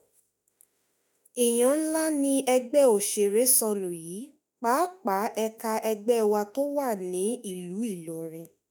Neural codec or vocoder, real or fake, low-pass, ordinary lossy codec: autoencoder, 48 kHz, 32 numbers a frame, DAC-VAE, trained on Japanese speech; fake; none; none